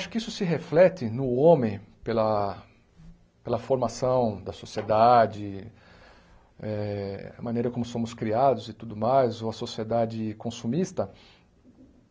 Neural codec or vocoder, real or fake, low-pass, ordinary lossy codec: none; real; none; none